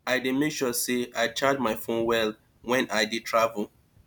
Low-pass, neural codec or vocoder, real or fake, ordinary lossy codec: none; none; real; none